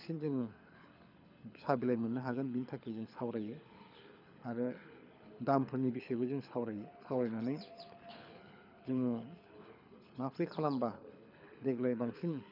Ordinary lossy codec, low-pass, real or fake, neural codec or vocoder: none; 5.4 kHz; fake; codec, 24 kHz, 6 kbps, HILCodec